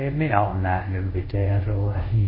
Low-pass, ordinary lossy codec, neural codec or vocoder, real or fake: 5.4 kHz; MP3, 24 kbps; codec, 24 kHz, 0.5 kbps, DualCodec; fake